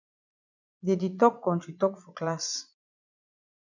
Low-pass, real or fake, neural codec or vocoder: 7.2 kHz; fake; vocoder, 44.1 kHz, 80 mel bands, Vocos